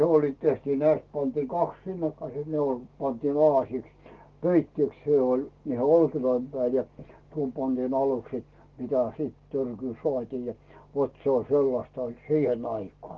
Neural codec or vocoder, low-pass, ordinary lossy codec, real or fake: none; 7.2 kHz; Opus, 16 kbps; real